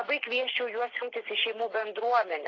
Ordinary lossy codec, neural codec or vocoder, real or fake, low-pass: AAC, 32 kbps; none; real; 7.2 kHz